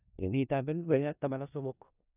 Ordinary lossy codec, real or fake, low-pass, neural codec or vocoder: none; fake; 3.6 kHz; codec, 16 kHz in and 24 kHz out, 0.4 kbps, LongCat-Audio-Codec, four codebook decoder